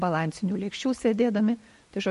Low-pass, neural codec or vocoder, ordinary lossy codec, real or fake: 14.4 kHz; none; MP3, 48 kbps; real